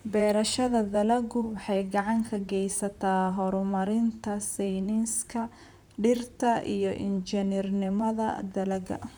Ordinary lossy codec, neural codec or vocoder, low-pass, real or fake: none; vocoder, 44.1 kHz, 128 mel bands, Pupu-Vocoder; none; fake